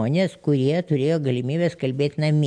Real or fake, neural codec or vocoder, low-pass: real; none; 9.9 kHz